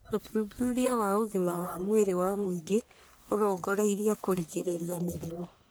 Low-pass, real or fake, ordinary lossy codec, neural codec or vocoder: none; fake; none; codec, 44.1 kHz, 1.7 kbps, Pupu-Codec